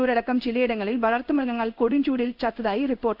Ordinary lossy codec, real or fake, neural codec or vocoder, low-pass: none; fake; codec, 16 kHz in and 24 kHz out, 1 kbps, XY-Tokenizer; 5.4 kHz